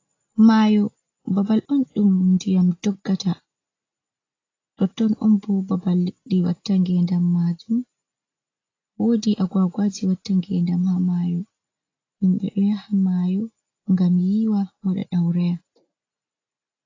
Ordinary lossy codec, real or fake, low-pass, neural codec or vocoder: AAC, 32 kbps; real; 7.2 kHz; none